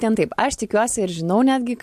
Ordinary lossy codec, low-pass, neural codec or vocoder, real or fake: MP3, 64 kbps; 14.4 kHz; none; real